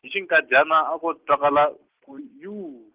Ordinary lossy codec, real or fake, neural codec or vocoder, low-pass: Opus, 16 kbps; real; none; 3.6 kHz